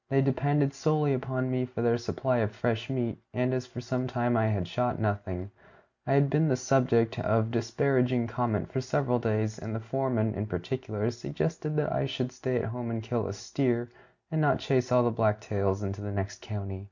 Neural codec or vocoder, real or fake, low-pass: none; real; 7.2 kHz